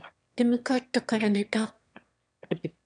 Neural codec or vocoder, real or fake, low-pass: autoencoder, 22.05 kHz, a latent of 192 numbers a frame, VITS, trained on one speaker; fake; 9.9 kHz